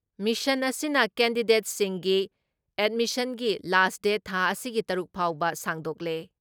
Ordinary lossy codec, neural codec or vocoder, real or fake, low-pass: none; none; real; none